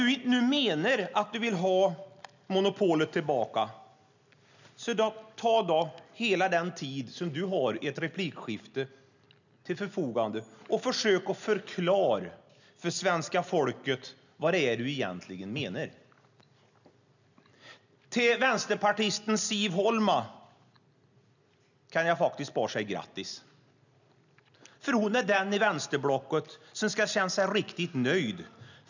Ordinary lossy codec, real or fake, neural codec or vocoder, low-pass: none; real; none; 7.2 kHz